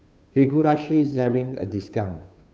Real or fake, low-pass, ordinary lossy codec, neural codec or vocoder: fake; none; none; codec, 16 kHz, 2 kbps, FunCodec, trained on Chinese and English, 25 frames a second